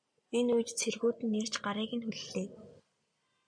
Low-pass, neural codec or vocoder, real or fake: 9.9 kHz; none; real